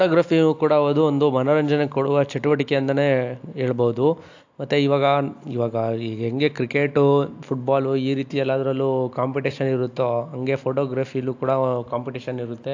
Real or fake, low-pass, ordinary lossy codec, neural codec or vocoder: real; 7.2 kHz; AAC, 48 kbps; none